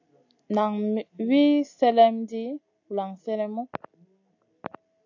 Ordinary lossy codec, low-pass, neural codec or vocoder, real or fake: AAC, 48 kbps; 7.2 kHz; none; real